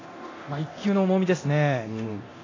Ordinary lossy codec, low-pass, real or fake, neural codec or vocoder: AAC, 32 kbps; 7.2 kHz; fake; codec, 24 kHz, 0.9 kbps, DualCodec